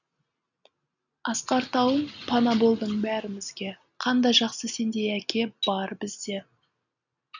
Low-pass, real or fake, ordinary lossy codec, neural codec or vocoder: 7.2 kHz; real; none; none